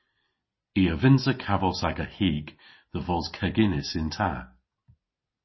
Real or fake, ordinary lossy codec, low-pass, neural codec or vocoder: real; MP3, 24 kbps; 7.2 kHz; none